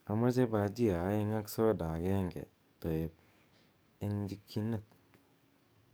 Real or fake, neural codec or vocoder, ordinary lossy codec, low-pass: fake; codec, 44.1 kHz, 7.8 kbps, DAC; none; none